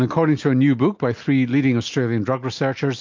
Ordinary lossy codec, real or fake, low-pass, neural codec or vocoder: MP3, 48 kbps; real; 7.2 kHz; none